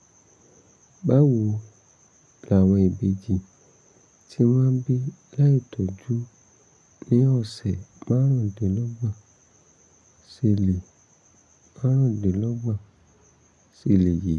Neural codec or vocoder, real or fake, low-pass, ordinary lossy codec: none; real; none; none